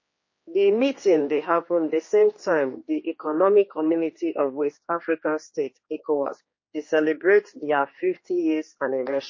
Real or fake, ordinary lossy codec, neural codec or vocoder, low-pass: fake; MP3, 32 kbps; codec, 16 kHz, 2 kbps, X-Codec, HuBERT features, trained on general audio; 7.2 kHz